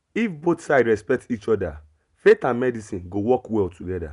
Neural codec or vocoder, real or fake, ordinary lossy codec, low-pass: none; real; none; 10.8 kHz